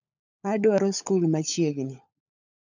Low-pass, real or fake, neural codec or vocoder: 7.2 kHz; fake; codec, 16 kHz, 16 kbps, FunCodec, trained on LibriTTS, 50 frames a second